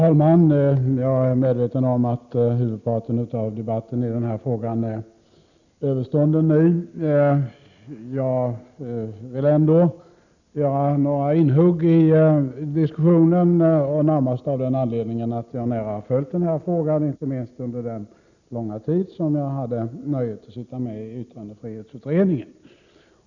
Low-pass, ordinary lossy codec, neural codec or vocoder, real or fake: 7.2 kHz; none; none; real